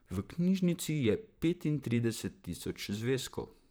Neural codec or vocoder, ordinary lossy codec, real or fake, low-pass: vocoder, 44.1 kHz, 128 mel bands, Pupu-Vocoder; none; fake; none